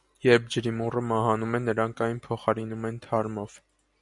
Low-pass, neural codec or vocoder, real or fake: 10.8 kHz; none; real